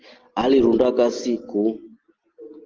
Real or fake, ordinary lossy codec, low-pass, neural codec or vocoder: real; Opus, 16 kbps; 7.2 kHz; none